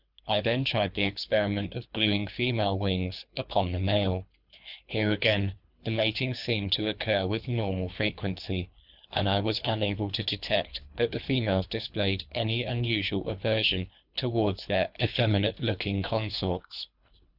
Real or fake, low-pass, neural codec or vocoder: fake; 5.4 kHz; codec, 24 kHz, 3 kbps, HILCodec